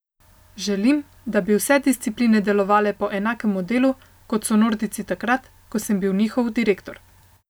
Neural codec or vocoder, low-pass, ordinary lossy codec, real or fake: none; none; none; real